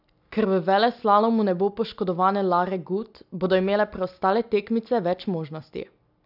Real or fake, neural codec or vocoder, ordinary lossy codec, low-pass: real; none; none; 5.4 kHz